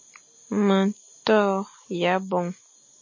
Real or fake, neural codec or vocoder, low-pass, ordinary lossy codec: real; none; 7.2 kHz; MP3, 32 kbps